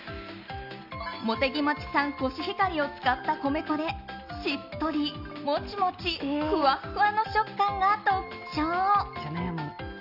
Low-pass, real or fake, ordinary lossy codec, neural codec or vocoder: 5.4 kHz; real; none; none